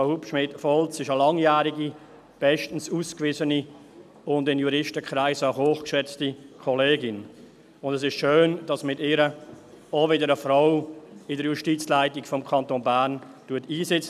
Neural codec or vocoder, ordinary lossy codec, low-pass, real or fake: none; none; 14.4 kHz; real